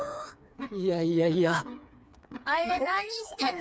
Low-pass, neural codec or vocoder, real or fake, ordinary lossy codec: none; codec, 16 kHz, 4 kbps, FreqCodec, smaller model; fake; none